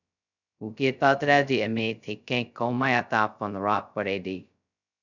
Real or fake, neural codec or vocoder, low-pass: fake; codec, 16 kHz, 0.2 kbps, FocalCodec; 7.2 kHz